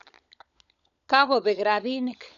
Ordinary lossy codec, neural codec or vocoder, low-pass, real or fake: none; codec, 16 kHz, 16 kbps, FunCodec, trained on LibriTTS, 50 frames a second; 7.2 kHz; fake